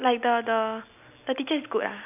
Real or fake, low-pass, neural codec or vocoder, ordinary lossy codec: real; 3.6 kHz; none; none